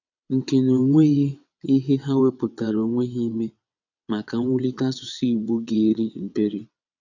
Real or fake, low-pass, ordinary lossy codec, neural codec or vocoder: fake; 7.2 kHz; none; vocoder, 22.05 kHz, 80 mel bands, WaveNeXt